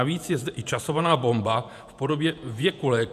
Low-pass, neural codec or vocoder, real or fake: 14.4 kHz; vocoder, 48 kHz, 128 mel bands, Vocos; fake